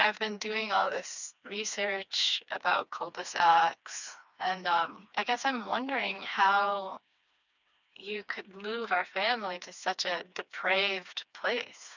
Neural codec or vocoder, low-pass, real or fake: codec, 16 kHz, 2 kbps, FreqCodec, smaller model; 7.2 kHz; fake